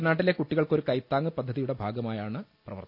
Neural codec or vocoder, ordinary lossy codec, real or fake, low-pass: none; none; real; 5.4 kHz